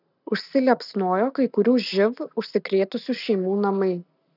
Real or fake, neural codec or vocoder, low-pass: real; none; 5.4 kHz